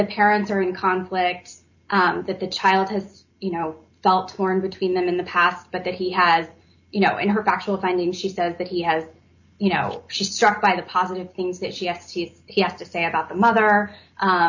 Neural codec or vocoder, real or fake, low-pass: none; real; 7.2 kHz